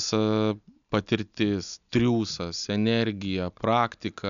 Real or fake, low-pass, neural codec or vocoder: real; 7.2 kHz; none